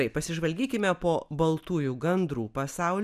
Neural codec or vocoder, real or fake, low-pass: none; real; 14.4 kHz